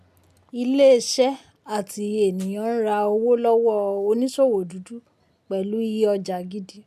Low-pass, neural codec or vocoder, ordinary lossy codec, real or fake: 14.4 kHz; none; none; real